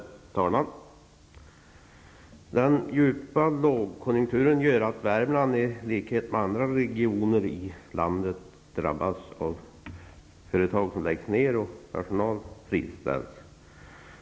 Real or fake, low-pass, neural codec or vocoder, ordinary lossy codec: real; none; none; none